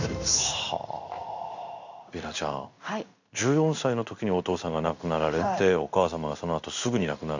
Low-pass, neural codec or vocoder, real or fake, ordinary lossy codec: 7.2 kHz; codec, 16 kHz in and 24 kHz out, 1 kbps, XY-Tokenizer; fake; none